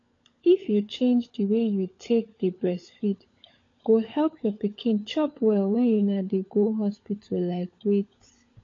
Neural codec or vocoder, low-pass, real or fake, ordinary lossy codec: codec, 16 kHz, 16 kbps, FunCodec, trained on LibriTTS, 50 frames a second; 7.2 kHz; fake; AAC, 32 kbps